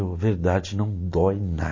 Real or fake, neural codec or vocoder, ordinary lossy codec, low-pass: real; none; MP3, 32 kbps; 7.2 kHz